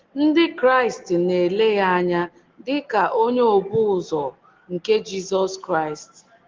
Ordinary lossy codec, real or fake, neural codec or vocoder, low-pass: Opus, 16 kbps; real; none; 7.2 kHz